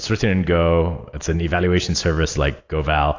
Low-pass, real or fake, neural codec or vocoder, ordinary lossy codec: 7.2 kHz; real; none; AAC, 48 kbps